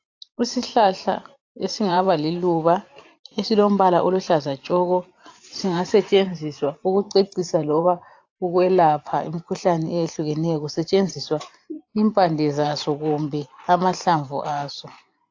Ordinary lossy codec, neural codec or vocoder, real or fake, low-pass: AAC, 48 kbps; vocoder, 44.1 kHz, 128 mel bands every 512 samples, BigVGAN v2; fake; 7.2 kHz